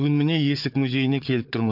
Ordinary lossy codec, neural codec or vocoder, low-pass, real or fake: none; codec, 16 kHz, 4 kbps, FunCodec, trained on Chinese and English, 50 frames a second; 5.4 kHz; fake